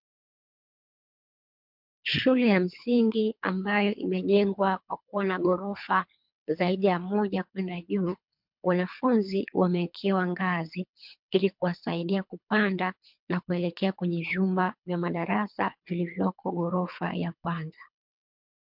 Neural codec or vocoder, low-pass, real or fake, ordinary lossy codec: codec, 24 kHz, 3 kbps, HILCodec; 5.4 kHz; fake; MP3, 48 kbps